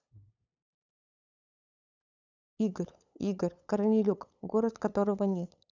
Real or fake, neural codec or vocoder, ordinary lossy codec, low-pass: fake; codec, 16 kHz, 8 kbps, FunCodec, trained on LibriTTS, 25 frames a second; none; 7.2 kHz